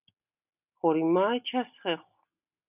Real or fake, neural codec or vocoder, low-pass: real; none; 3.6 kHz